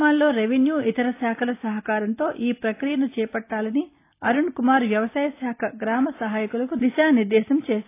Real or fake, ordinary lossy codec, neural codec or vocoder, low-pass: real; AAC, 24 kbps; none; 3.6 kHz